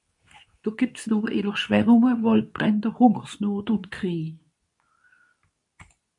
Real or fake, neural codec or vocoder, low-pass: fake; codec, 24 kHz, 0.9 kbps, WavTokenizer, medium speech release version 2; 10.8 kHz